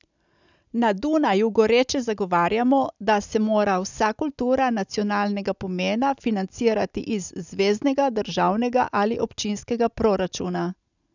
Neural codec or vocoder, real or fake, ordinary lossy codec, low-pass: none; real; none; 7.2 kHz